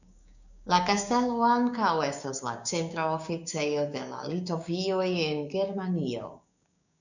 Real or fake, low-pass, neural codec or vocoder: fake; 7.2 kHz; codec, 16 kHz, 6 kbps, DAC